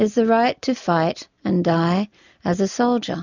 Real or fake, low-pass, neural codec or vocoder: real; 7.2 kHz; none